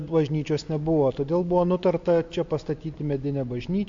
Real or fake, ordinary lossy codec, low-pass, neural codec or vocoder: real; MP3, 48 kbps; 7.2 kHz; none